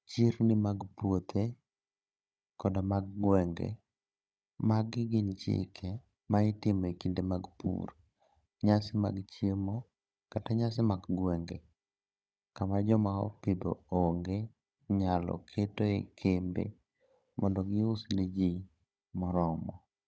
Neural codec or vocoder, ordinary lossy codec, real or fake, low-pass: codec, 16 kHz, 16 kbps, FunCodec, trained on Chinese and English, 50 frames a second; none; fake; none